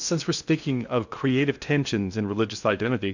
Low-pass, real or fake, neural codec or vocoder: 7.2 kHz; fake; codec, 16 kHz in and 24 kHz out, 0.6 kbps, FocalCodec, streaming, 2048 codes